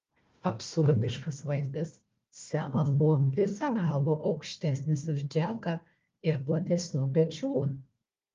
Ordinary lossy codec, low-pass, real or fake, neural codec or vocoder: Opus, 32 kbps; 7.2 kHz; fake; codec, 16 kHz, 1 kbps, FunCodec, trained on Chinese and English, 50 frames a second